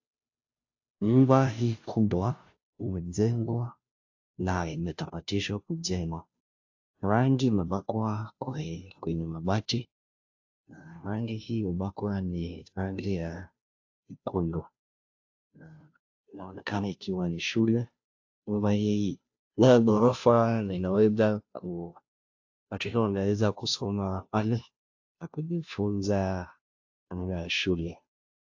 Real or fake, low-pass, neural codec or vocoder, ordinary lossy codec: fake; 7.2 kHz; codec, 16 kHz, 0.5 kbps, FunCodec, trained on Chinese and English, 25 frames a second; AAC, 48 kbps